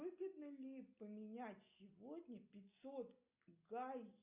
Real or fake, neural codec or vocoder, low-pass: real; none; 3.6 kHz